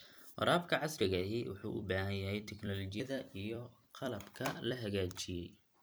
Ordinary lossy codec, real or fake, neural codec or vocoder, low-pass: none; fake; vocoder, 44.1 kHz, 128 mel bands every 256 samples, BigVGAN v2; none